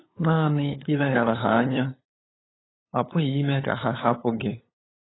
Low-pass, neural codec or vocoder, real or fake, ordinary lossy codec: 7.2 kHz; codec, 16 kHz, 8 kbps, FunCodec, trained on LibriTTS, 25 frames a second; fake; AAC, 16 kbps